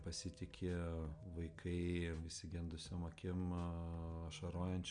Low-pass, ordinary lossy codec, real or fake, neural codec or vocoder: 9.9 kHz; MP3, 64 kbps; real; none